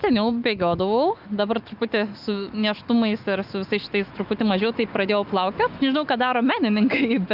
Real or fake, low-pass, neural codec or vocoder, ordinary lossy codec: fake; 5.4 kHz; autoencoder, 48 kHz, 128 numbers a frame, DAC-VAE, trained on Japanese speech; Opus, 24 kbps